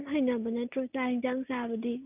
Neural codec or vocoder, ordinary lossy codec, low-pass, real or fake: none; none; 3.6 kHz; real